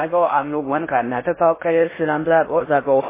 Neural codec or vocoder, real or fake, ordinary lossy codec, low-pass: codec, 16 kHz in and 24 kHz out, 0.6 kbps, FocalCodec, streaming, 4096 codes; fake; MP3, 16 kbps; 3.6 kHz